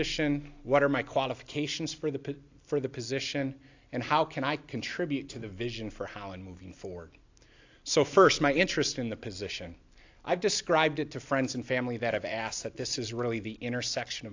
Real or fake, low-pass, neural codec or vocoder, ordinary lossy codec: real; 7.2 kHz; none; AAC, 48 kbps